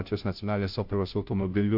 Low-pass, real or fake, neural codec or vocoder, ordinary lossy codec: 5.4 kHz; fake; codec, 16 kHz, 0.5 kbps, FunCodec, trained on Chinese and English, 25 frames a second; AAC, 48 kbps